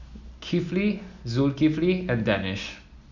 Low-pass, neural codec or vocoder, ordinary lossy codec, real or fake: 7.2 kHz; none; none; real